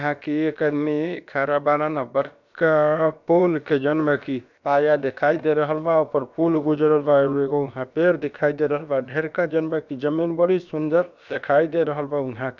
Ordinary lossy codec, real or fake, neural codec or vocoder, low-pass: none; fake; codec, 16 kHz, about 1 kbps, DyCAST, with the encoder's durations; 7.2 kHz